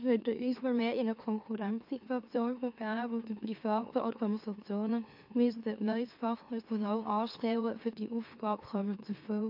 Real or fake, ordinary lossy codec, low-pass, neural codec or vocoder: fake; AAC, 48 kbps; 5.4 kHz; autoencoder, 44.1 kHz, a latent of 192 numbers a frame, MeloTTS